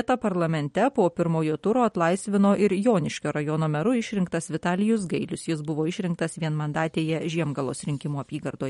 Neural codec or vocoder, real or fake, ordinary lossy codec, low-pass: none; real; MP3, 48 kbps; 19.8 kHz